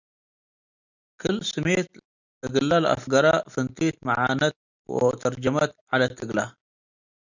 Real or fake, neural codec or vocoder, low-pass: real; none; 7.2 kHz